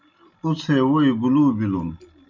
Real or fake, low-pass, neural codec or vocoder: real; 7.2 kHz; none